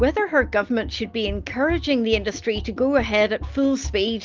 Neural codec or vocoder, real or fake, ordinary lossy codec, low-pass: none; real; Opus, 24 kbps; 7.2 kHz